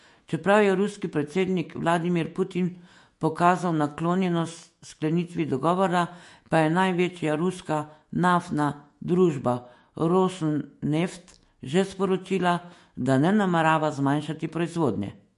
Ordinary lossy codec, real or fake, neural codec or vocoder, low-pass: MP3, 48 kbps; fake; autoencoder, 48 kHz, 128 numbers a frame, DAC-VAE, trained on Japanese speech; 14.4 kHz